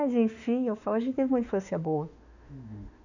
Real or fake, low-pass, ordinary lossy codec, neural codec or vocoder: fake; 7.2 kHz; none; autoencoder, 48 kHz, 32 numbers a frame, DAC-VAE, trained on Japanese speech